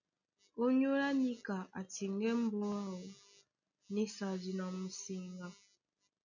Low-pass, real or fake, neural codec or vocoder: 7.2 kHz; real; none